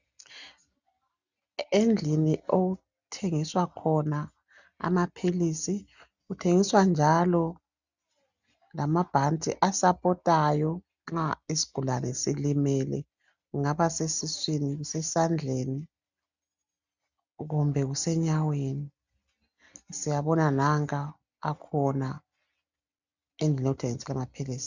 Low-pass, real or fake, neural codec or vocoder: 7.2 kHz; real; none